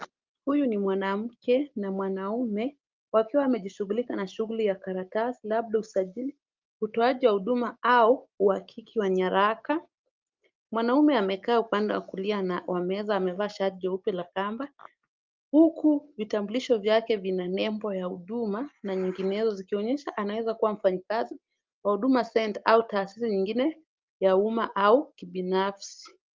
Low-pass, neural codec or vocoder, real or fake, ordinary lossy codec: 7.2 kHz; none; real; Opus, 24 kbps